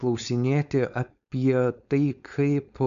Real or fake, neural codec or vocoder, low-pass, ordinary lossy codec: fake; codec, 16 kHz, 4.8 kbps, FACodec; 7.2 kHz; MP3, 96 kbps